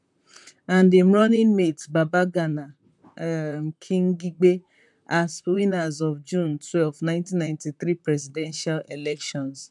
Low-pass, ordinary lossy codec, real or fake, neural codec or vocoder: 10.8 kHz; none; fake; vocoder, 44.1 kHz, 128 mel bands, Pupu-Vocoder